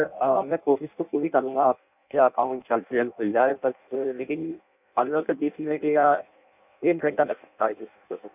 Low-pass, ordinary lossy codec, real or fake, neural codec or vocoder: 3.6 kHz; none; fake; codec, 16 kHz in and 24 kHz out, 0.6 kbps, FireRedTTS-2 codec